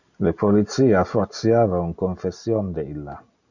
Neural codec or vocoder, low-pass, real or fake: none; 7.2 kHz; real